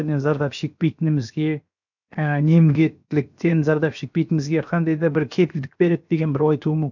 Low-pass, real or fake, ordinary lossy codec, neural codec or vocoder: 7.2 kHz; fake; none; codec, 16 kHz, 0.7 kbps, FocalCodec